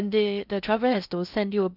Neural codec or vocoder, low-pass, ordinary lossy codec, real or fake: codec, 16 kHz in and 24 kHz out, 0.6 kbps, FocalCodec, streaming, 2048 codes; 5.4 kHz; none; fake